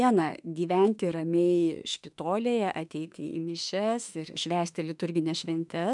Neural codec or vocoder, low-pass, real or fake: autoencoder, 48 kHz, 32 numbers a frame, DAC-VAE, trained on Japanese speech; 10.8 kHz; fake